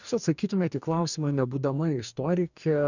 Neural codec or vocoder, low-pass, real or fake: codec, 44.1 kHz, 2.6 kbps, DAC; 7.2 kHz; fake